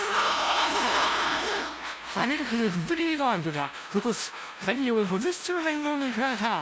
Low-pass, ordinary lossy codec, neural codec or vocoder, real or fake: none; none; codec, 16 kHz, 0.5 kbps, FunCodec, trained on LibriTTS, 25 frames a second; fake